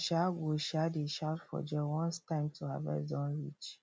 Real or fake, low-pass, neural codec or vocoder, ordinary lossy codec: real; none; none; none